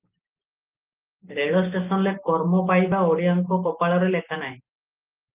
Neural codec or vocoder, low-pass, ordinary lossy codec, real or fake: none; 3.6 kHz; Opus, 24 kbps; real